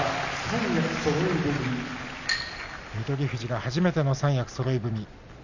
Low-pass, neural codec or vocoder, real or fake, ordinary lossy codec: 7.2 kHz; none; real; none